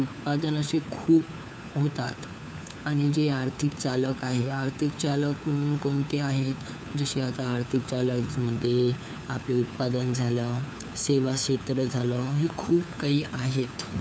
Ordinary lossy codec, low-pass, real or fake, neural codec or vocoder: none; none; fake; codec, 16 kHz, 4 kbps, FunCodec, trained on LibriTTS, 50 frames a second